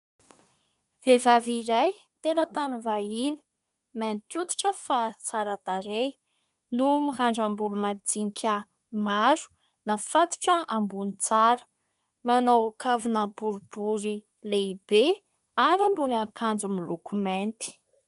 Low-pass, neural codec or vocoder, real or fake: 10.8 kHz; codec, 24 kHz, 1 kbps, SNAC; fake